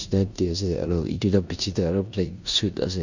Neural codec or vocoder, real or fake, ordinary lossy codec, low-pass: codec, 16 kHz in and 24 kHz out, 0.9 kbps, LongCat-Audio-Codec, four codebook decoder; fake; none; 7.2 kHz